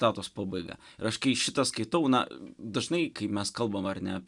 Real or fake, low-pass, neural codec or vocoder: real; 10.8 kHz; none